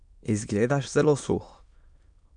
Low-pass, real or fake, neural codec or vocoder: 9.9 kHz; fake; autoencoder, 22.05 kHz, a latent of 192 numbers a frame, VITS, trained on many speakers